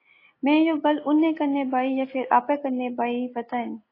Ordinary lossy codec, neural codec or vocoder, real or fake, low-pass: AAC, 24 kbps; none; real; 5.4 kHz